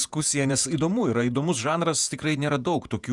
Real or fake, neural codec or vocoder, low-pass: fake; vocoder, 48 kHz, 128 mel bands, Vocos; 10.8 kHz